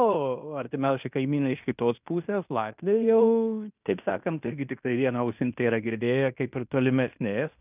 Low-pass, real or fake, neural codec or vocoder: 3.6 kHz; fake; codec, 16 kHz in and 24 kHz out, 0.9 kbps, LongCat-Audio-Codec, fine tuned four codebook decoder